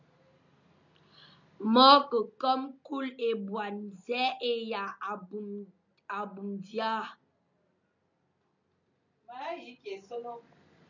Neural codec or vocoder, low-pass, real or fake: none; 7.2 kHz; real